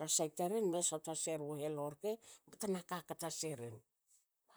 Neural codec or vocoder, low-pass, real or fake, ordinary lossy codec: vocoder, 44.1 kHz, 128 mel bands, Pupu-Vocoder; none; fake; none